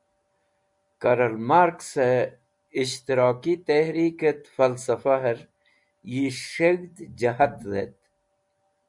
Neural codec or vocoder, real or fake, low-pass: none; real; 10.8 kHz